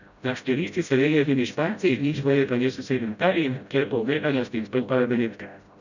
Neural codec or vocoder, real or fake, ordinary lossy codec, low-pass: codec, 16 kHz, 0.5 kbps, FreqCodec, smaller model; fake; none; 7.2 kHz